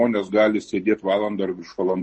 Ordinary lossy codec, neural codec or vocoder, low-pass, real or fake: MP3, 32 kbps; none; 10.8 kHz; real